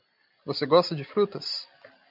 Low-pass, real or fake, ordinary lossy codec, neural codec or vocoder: 5.4 kHz; real; MP3, 48 kbps; none